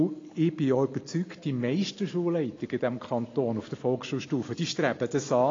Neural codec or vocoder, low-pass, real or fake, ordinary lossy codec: none; 7.2 kHz; real; AAC, 32 kbps